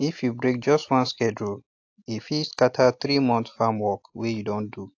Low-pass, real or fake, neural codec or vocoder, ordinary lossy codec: 7.2 kHz; real; none; AAC, 48 kbps